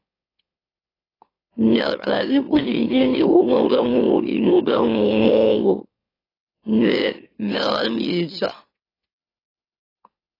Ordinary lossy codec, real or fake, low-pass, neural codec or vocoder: AAC, 24 kbps; fake; 5.4 kHz; autoencoder, 44.1 kHz, a latent of 192 numbers a frame, MeloTTS